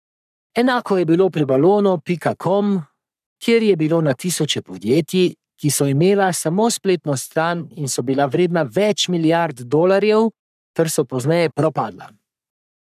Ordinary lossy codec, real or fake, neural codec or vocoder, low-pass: none; fake; codec, 44.1 kHz, 3.4 kbps, Pupu-Codec; 14.4 kHz